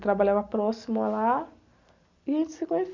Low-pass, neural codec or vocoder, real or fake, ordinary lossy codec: 7.2 kHz; none; real; MP3, 64 kbps